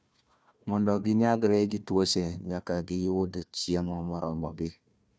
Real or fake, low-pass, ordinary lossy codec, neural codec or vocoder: fake; none; none; codec, 16 kHz, 1 kbps, FunCodec, trained on Chinese and English, 50 frames a second